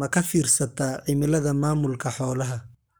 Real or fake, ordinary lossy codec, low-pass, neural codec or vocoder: fake; none; none; codec, 44.1 kHz, 7.8 kbps, Pupu-Codec